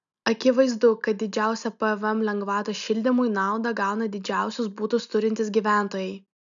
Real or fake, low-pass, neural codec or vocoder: real; 7.2 kHz; none